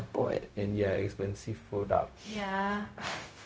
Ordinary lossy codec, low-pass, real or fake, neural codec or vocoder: none; none; fake; codec, 16 kHz, 0.4 kbps, LongCat-Audio-Codec